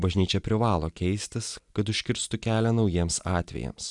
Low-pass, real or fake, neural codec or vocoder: 10.8 kHz; real; none